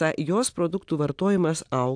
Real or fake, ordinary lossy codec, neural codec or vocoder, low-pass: real; AAC, 64 kbps; none; 9.9 kHz